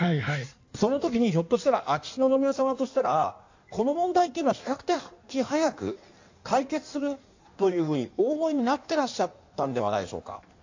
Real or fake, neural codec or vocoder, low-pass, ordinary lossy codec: fake; codec, 16 kHz in and 24 kHz out, 1.1 kbps, FireRedTTS-2 codec; 7.2 kHz; AAC, 48 kbps